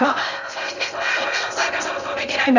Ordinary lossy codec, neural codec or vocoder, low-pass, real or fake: none; codec, 16 kHz in and 24 kHz out, 0.6 kbps, FocalCodec, streaming, 2048 codes; 7.2 kHz; fake